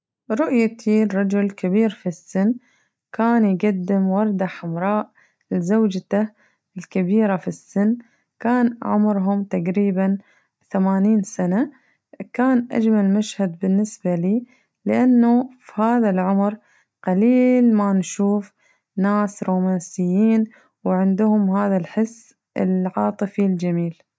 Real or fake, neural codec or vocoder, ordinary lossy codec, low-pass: real; none; none; none